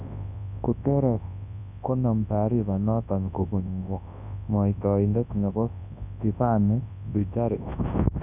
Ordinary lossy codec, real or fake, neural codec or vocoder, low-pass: none; fake; codec, 24 kHz, 0.9 kbps, WavTokenizer, large speech release; 3.6 kHz